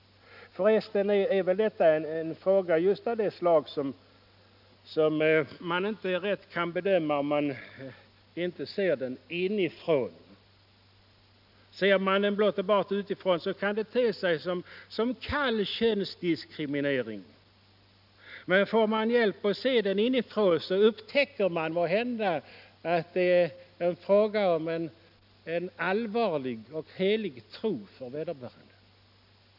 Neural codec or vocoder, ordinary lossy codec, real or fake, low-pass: none; none; real; 5.4 kHz